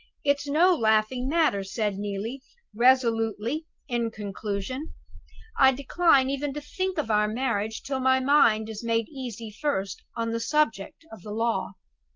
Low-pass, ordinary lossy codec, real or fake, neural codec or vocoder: 7.2 kHz; Opus, 32 kbps; real; none